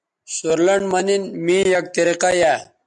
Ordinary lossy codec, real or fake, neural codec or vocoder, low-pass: MP3, 96 kbps; real; none; 10.8 kHz